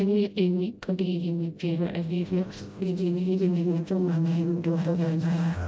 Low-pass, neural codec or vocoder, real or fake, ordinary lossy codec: none; codec, 16 kHz, 0.5 kbps, FreqCodec, smaller model; fake; none